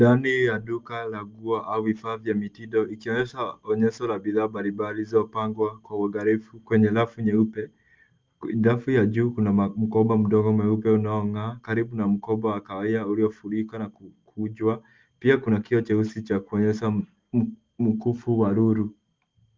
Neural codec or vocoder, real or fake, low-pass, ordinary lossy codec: none; real; 7.2 kHz; Opus, 32 kbps